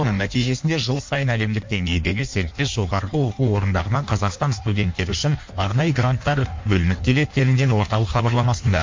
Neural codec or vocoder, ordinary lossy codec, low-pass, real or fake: codec, 16 kHz in and 24 kHz out, 1.1 kbps, FireRedTTS-2 codec; MP3, 48 kbps; 7.2 kHz; fake